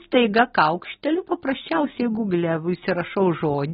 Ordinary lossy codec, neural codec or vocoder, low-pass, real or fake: AAC, 16 kbps; none; 19.8 kHz; real